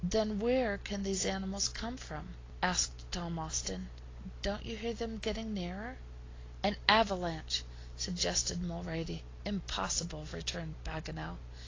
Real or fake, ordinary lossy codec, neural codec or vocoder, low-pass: real; AAC, 32 kbps; none; 7.2 kHz